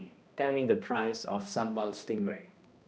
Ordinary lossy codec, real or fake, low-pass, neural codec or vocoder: none; fake; none; codec, 16 kHz, 1 kbps, X-Codec, HuBERT features, trained on balanced general audio